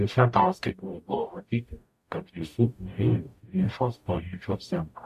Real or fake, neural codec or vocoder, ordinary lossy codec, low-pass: fake; codec, 44.1 kHz, 0.9 kbps, DAC; MP3, 64 kbps; 14.4 kHz